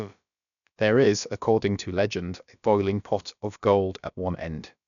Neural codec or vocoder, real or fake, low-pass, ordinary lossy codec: codec, 16 kHz, about 1 kbps, DyCAST, with the encoder's durations; fake; 7.2 kHz; none